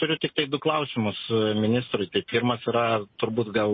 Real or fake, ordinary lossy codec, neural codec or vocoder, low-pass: real; MP3, 24 kbps; none; 7.2 kHz